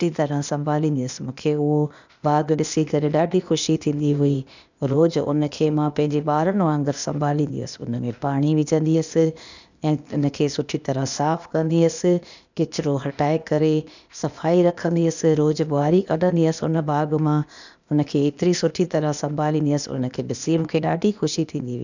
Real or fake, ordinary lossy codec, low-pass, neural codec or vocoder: fake; none; 7.2 kHz; codec, 16 kHz, 0.8 kbps, ZipCodec